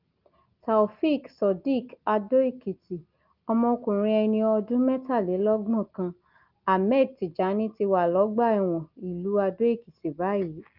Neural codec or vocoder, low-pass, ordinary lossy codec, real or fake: none; 5.4 kHz; Opus, 24 kbps; real